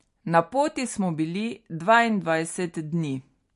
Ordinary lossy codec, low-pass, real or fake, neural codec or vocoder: MP3, 48 kbps; 14.4 kHz; real; none